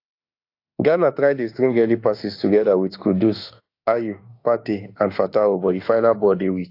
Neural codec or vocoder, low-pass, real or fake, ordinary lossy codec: autoencoder, 48 kHz, 32 numbers a frame, DAC-VAE, trained on Japanese speech; 5.4 kHz; fake; AAC, 32 kbps